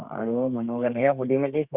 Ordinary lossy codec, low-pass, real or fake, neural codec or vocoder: Opus, 64 kbps; 3.6 kHz; fake; codec, 32 kHz, 1.9 kbps, SNAC